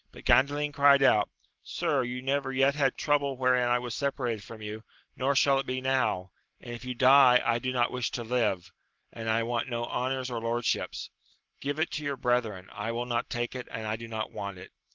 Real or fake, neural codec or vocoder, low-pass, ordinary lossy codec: real; none; 7.2 kHz; Opus, 16 kbps